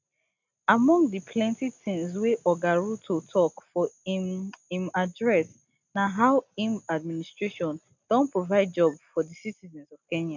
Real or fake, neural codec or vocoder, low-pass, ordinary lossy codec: real; none; 7.2 kHz; none